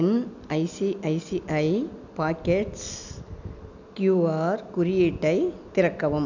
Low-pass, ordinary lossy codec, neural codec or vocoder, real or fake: 7.2 kHz; none; none; real